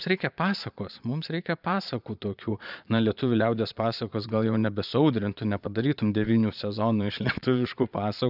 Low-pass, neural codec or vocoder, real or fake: 5.4 kHz; vocoder, 22.05 kHz, 80 mel bands, Vocos; fake